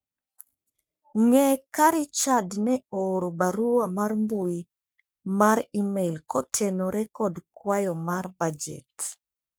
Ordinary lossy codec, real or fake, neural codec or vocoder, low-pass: none; fake; codec, 44.1 kHz, 3.4 kbps, Pupu-Codec; none